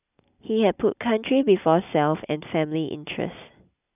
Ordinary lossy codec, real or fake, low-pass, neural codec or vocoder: none; real; 3.6 kHz; none